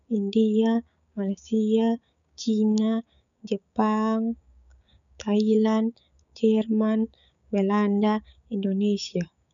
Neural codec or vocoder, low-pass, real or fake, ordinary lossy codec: codec, 16 kHz, 6 kbps, DAC; 7.2 kHz; fake; none